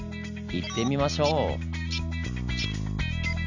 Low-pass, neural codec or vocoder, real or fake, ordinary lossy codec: 7.2 kHz; none; real; none